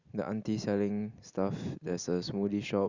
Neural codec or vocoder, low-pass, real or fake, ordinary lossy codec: none; 7.2 kHz; real; Opus, 64 kbps